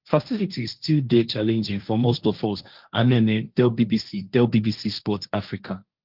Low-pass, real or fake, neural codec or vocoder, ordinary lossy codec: 5.4 kHz; fake; codec, 16 kHz, 1.1 kbps, Voila-Tokenizer; Opus, 32 kbps